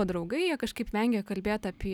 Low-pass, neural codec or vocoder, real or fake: 19.8 kHz; none; real